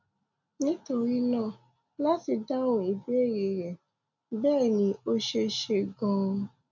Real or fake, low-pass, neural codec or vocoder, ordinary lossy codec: real; 7.2 kHz; none; MP3, 64 kbps